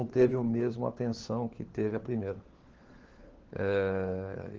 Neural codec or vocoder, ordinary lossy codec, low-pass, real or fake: codec, 16 kHz, 4 kbps, FunCodec, trained on LibriTTS, 50 frames a second; Opus, 16 kbps; 7.2 kHz; fake